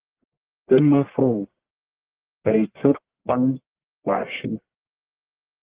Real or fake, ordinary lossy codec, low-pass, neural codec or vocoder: fake; Opus, 16 kbps; 3.6 kHz; codec, 44.1 kHz, 1.7 kbps, Pupu-Codec